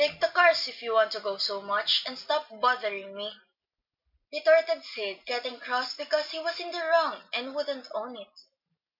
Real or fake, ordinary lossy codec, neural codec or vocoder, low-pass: real; MP3, 48 kbps; none; 5.4 kHz